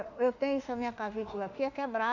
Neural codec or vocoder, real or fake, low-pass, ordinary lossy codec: autoencoder, 48 kHz, 32 numbers a frame, DAC-VAE, trained on Japanese speech; fake; 7.2 kHz; none